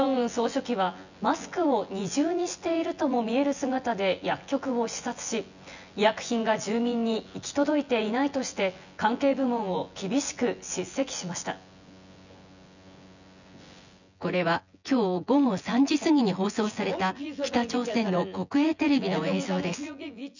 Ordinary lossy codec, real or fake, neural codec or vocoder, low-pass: none; fake; vocoder, 24 kHz, 100 mel bands, Vocos; 7.2 kHz